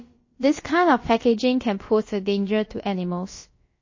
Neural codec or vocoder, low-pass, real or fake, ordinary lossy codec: codec, 16 kHz, about 1 kbps, DyCAST, with the encoder's durations; 7.2 kHz; fake; MP3, 32 kbps